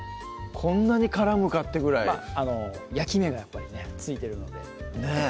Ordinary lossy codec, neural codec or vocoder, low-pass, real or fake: none; none; none; real